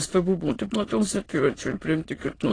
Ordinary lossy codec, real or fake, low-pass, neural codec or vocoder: AAC, 32 kbps; fake; 9.9 kHz; autoencoder, 22.05 kHz, a latent of 192 numbers a frame, VITS, trained on many speakers